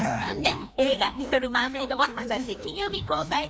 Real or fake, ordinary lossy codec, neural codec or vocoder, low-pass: fake; none; codec, 16 kHz, 1 kbps, FreqCodec, larger model; none